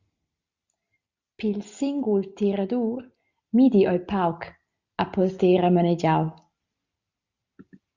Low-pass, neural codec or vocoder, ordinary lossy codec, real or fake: 7.2 kHz; none; Opus, 64 kbps; real